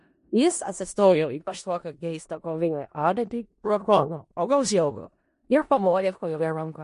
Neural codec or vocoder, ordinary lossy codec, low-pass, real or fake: codec, 16 kHz in and 24 kHz out, 0.4 kbps, LongCat-Audio-Codec, four codebook decoder; MP3, 48 kbps; 10.8 kHz; fake